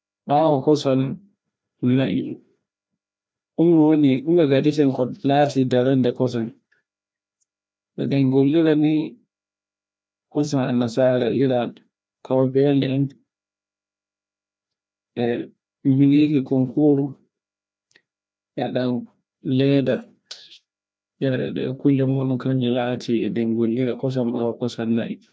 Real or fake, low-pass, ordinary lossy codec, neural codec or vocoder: fake; none; none; codec, 16 kHz, 1 kbps, FreqCodec, larger model